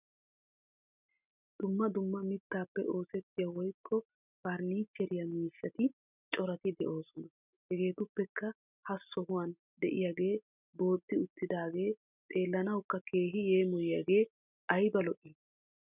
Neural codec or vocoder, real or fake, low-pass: none; real; 3.6 kHz